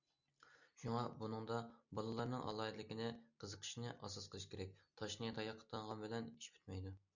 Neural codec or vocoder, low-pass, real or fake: none; 7.2 kHz; real